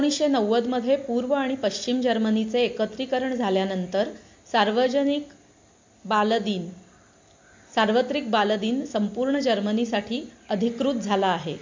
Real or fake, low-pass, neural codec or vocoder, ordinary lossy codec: real; 7.2 kHz; none; MP3, 48 kbps